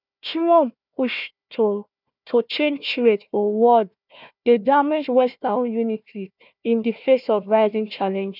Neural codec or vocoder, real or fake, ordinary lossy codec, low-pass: codec, 16 kHz, 1 kbps, FunCodec, trained on Chinese and English, 50 frames a second; fake; none; 5.4 kHz